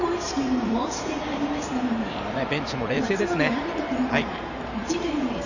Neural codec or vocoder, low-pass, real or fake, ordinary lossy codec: vocoder, 44.1 kHz, 128 mel bands every 512 samples, BigVGAN v2; 7.2 kHz; fake; none